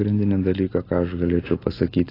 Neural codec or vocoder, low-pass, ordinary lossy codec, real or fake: none; 5.4 kHz; AAC, 24 kbps; real